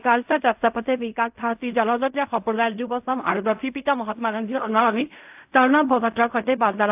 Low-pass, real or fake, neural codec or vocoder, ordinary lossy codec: 3.6 kHz; fake; codec, 16 kHz in and 24 kHz out, 0.4 kbps, LongCat-Audio-Codec, fine tuned four codebook decoder; AAC, 32 kbps